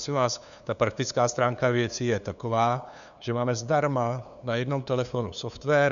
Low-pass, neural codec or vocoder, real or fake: 7.2 kHz; codec, 16 kHz, 2 kbps, FunCodec, trained on LibriTTS, 25 frames a second; fake